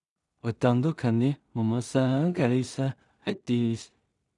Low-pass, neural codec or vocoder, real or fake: 10.8 kHz; codec, 16 kHz in and 24 kHz out, 0.4 kbps, LongCat-Audio-Codec, two codebook decoder; fake